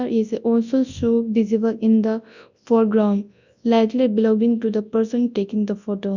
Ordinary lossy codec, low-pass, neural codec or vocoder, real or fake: none; 7.2 kHz; codec, 24 kHz, 0.9 kbps, WavTokenizer, large speech release; fake